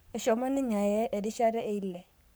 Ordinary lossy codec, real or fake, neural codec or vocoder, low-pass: none; fake; codec, 44.1 kHz, 7.8 kbps, Pupu-Codec; none